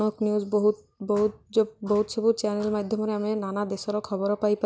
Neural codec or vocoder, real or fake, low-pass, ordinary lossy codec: none; real; none; none